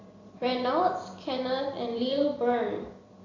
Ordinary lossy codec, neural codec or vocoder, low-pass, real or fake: AAC, 32 kbps; vocoder, 44.1 kHz, 128 mel bands every 256 samples, BigVGAN v2; 7.2 kHz; fake